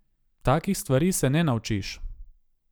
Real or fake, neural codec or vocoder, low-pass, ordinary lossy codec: real; none; none; none